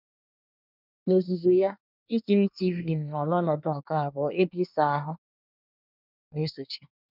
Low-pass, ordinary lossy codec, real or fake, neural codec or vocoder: 5.4 kHz; none; fake; codec, 24 kHz, 1 kbps, SNAC